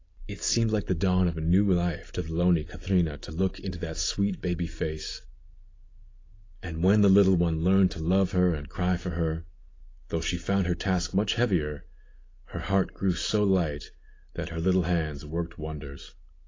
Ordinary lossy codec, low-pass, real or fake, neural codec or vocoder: AAC, 32 kbps; 7.2 kHz; real; none